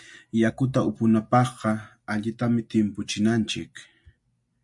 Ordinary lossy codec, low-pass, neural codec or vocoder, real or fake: MP3, 64 kbps; 10.8 kHz; none; real